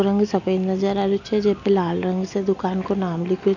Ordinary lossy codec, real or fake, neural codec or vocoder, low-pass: none; real; none; 7.2 kHz